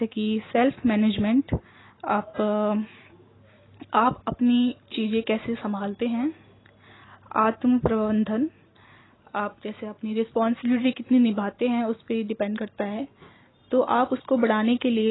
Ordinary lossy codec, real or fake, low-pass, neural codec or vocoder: AAC, 16 kbps; real; 7.2 kHz; none